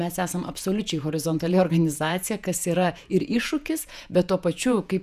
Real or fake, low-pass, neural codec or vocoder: fake; 14.4 kHz; vocoder, 48 kHz, 128 mel bands, Vocos